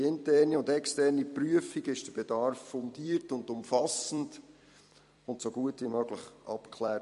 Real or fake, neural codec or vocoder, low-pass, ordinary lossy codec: fake; vocoder, 44.1 kHz, 128 mel bands every 256 samples, BigVGAN v2; 14.4 kHz; MP3, 48 kbps